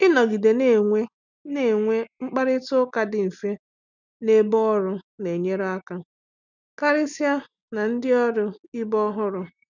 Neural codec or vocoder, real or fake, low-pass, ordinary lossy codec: none; real; 7.2 kHz; none